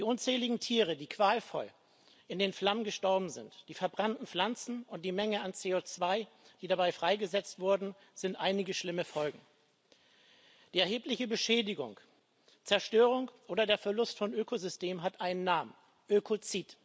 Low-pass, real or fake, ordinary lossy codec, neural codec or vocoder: none; real; none; none